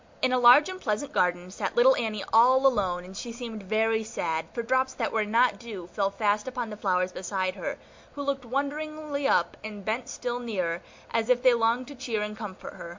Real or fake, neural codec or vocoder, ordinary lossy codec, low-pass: real; none; MP3, 48 kbps; 7.2 kHz